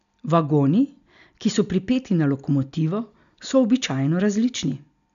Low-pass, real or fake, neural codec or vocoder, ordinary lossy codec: 7.2 kHz; real; none; none